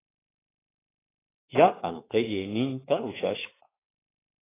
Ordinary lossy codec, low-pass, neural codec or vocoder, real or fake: AAC, 16 kbps; 3.6 kHz; autoencoder, 48 kHz, 32 numbers a frame, DAC-VAE, trained on Japanese speech; fake